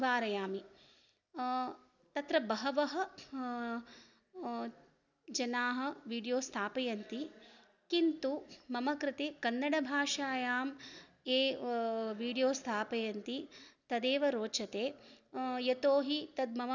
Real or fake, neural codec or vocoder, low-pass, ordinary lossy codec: real; none; 7.2 kHz; none